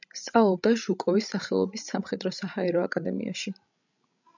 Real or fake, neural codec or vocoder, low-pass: fake; codec, 16 kHz, 16 kbps, FreqCodec, larger model; 7.2 kHz